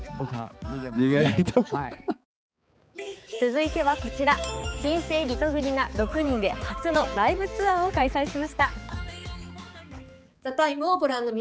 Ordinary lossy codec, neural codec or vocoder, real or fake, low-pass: none; codec, 16 kHz, 4 kbps, X-Codec, HuBERT features, trained on balanced general audio; fake; none